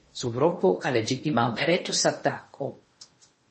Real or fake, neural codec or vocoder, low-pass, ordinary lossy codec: fake; codec, 16 kHz in and 24 kHz out, 0.8 kbps, FocalCodec, streaming, 65536 codes; 10.8 kHz; MP3, 32 kbps